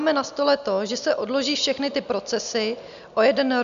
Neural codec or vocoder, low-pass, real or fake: none; 7.2 kHz; real